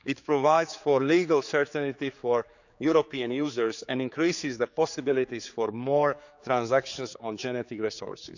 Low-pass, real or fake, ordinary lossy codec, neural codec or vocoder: 7.2 kHz; fake; none; codec, 16 kHz, 4 kbps, X-Codec, HuBERT features, trained on general audio